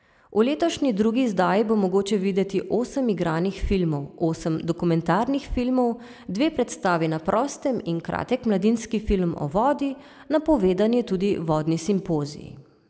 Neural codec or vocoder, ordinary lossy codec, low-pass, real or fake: none; none; none; real